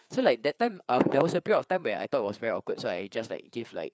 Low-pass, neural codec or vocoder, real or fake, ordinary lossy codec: none; codec, 16 kHz, 4 kbps, FreqCodec, larger model; fake; none